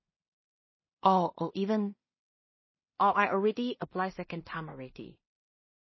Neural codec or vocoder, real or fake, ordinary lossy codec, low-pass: codec, 16 kHz in and 24 kHz out, 0.4 kbps, LongCat-Audio-Codec, two codebook decoder; fake; MP3, 24 kbps; 7.2 kHz